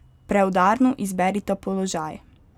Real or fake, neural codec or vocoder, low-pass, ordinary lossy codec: real; none; 19.8 kHz; none